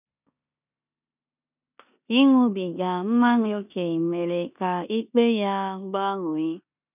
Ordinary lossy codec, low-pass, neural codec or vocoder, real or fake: none; 3.6 kHz; codec, 16 kHz in and 24 kHz out, 0.9 kbps, LongCat-Audio-Codec, four codebook decoder; fake